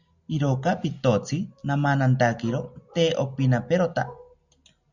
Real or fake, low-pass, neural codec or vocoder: real; 7.2 kHz; none